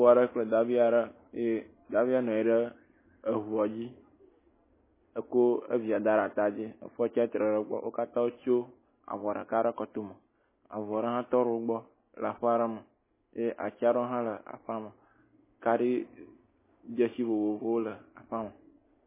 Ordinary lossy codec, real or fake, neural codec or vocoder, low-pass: MP3, 16 kbps; real; none; 3.6 kHz